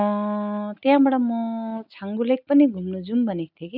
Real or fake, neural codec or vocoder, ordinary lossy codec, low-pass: real; none; none; 5.4 kHz